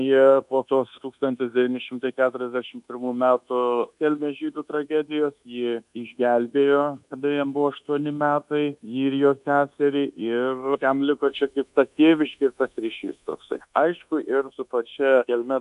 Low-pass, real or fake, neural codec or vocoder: 10.8 kHz; fake; codec, 24 kHz, 1.2 kbps, DualCodec